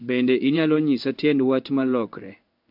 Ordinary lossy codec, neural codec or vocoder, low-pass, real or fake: none; codec, 16 kHz in and 24 kHz out, 1 kbps, XY-Tokenizer; 5.4 kHz; fake